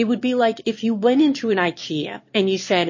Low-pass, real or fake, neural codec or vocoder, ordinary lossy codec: 7.2 kHz; fake; autoencoder, 22.05 kHz, a latent of 192 numbers a frame, VITS, trained on one speaker; MP3, 32 kbps